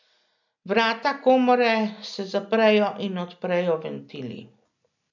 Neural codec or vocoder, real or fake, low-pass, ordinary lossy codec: none; real; 7.2 kHz; none